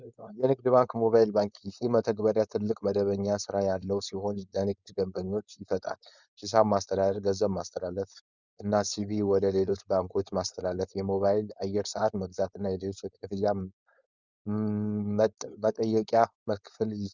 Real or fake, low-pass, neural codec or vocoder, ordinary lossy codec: fake; 7.2 kHz; codec, 16 kHz, 4.8 kbps, FACodec; Opus, 64 kbps